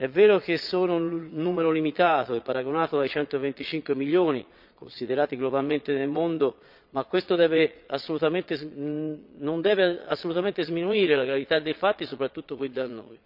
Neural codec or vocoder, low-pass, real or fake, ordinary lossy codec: vocoder, 22.05 kHz, 80 mel bands, Vocos; 5.4 kHz; fake; none